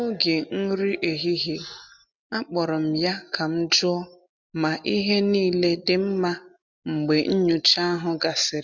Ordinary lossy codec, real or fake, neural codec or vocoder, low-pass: none; real; none; 7.2 kHz